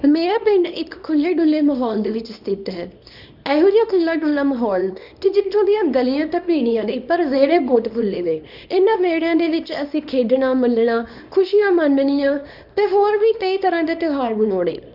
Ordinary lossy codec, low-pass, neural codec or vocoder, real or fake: none; 5.4 kHz; codec, 24 kHz, 0.9 kbps, WavTokenizer, small release; fake